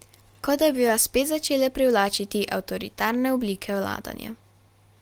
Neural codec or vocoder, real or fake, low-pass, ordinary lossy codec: none; real; 19.8 kHz; Opus, 24 kbps